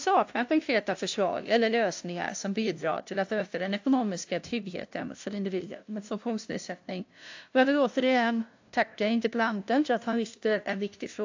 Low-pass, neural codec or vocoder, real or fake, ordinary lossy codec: 7.2 kHz; codec, 16 kHz, 0.5 kbps, FunCodec, trained on LibriTTS, 25 frames a second; fake; AAC, 48 kbps